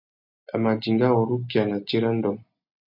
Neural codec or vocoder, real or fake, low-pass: none; real; 5.4 kHz